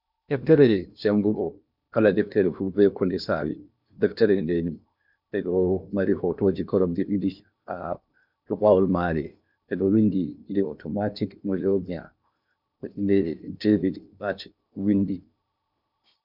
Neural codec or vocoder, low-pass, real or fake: codec, 16 kHz in and 24 kHz out, 0.8 kbps, FocalCodec, streaming, 65536 codes; 5.4 kHz; fake